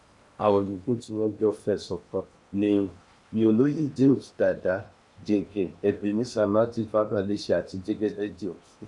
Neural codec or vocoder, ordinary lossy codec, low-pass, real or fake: codec, 16 kHz in and 24 kHz out, 0.8 kbps, FocalCodec, streaming, 65536 codes; none; 10.8 kHz; fake